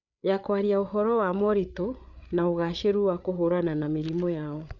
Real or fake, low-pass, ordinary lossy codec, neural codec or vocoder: fake; 7.2 kHz; none; codec, 16 kHz, 8 kbps, FreqCodec, larger model